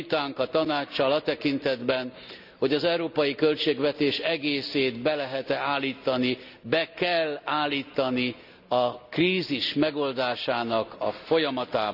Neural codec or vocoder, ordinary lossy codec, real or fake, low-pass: none; none; real; 5.4 kHz